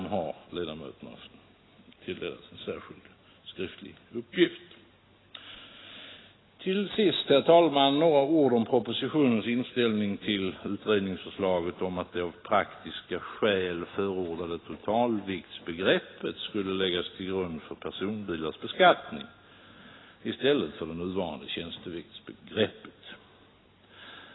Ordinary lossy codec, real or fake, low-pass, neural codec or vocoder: AAC, 16 kbps; real; 7.2 kHz; none